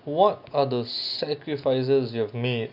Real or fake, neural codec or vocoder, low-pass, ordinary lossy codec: real; none; 5.4 kHz; none